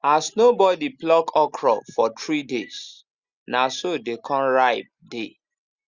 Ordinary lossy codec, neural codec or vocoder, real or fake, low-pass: Opus, 64 kbps; none; real; 7.2 kHz